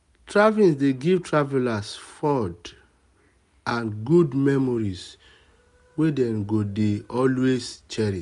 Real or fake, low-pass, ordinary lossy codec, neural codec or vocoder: real; 10.8 kHz; none; none